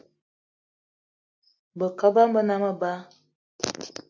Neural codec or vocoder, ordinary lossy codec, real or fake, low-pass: none; AAC, 48 kbps; real; 7.2 kHz